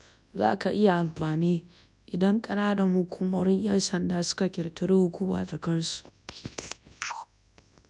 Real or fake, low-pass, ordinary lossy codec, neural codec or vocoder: fake; 10.8 kHz; none; codec, 24 kHz, 0.9 kbps, WavTokenizer, large speech release